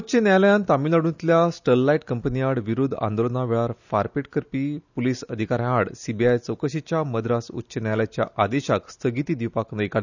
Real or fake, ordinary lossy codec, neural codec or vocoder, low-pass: real; none; none; 7.2 kHz